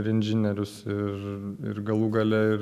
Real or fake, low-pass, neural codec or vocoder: real; 14.4 kHz; none